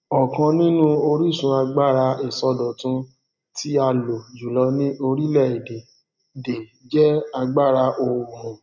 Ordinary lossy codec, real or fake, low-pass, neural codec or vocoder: none; real; 7.2 kHz; none